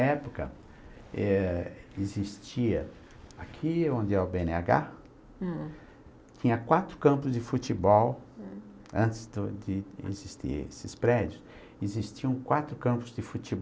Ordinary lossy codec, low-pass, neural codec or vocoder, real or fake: none; none; none; real